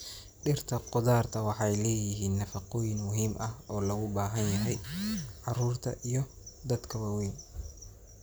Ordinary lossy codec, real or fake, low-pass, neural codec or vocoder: none; real; none; none